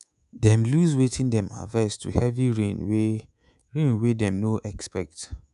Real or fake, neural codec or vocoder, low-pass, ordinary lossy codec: fake; codec, 24 kHz, 3.1 kbps, DualCodec; 10.8 kHz; none